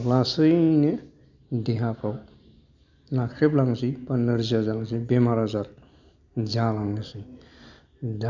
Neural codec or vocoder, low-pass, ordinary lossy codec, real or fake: none; 7.2 kHz; none; real